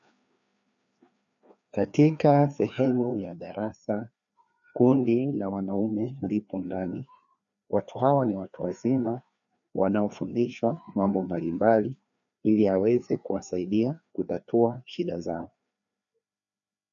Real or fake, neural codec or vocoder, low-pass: fake; codec, 16 kHz, 2 kbps, FreqCodec, larger model; 7.2 kHz